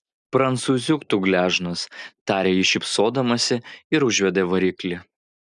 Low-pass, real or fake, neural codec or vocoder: 10.8 kHz; real; none